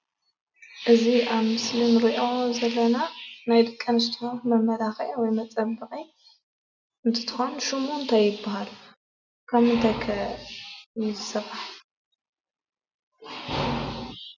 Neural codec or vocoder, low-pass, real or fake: none; 7.2 kHz; real